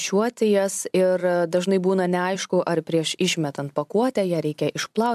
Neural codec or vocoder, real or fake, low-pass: vocoder, 44.1 kHz, 128 mel bands every 256 samples, BigVGAN v2; fake; 14.4 kHz